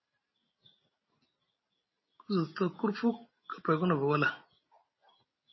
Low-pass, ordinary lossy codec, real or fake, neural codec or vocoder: 7.2 kHz; MP3, 24 kbps; real; none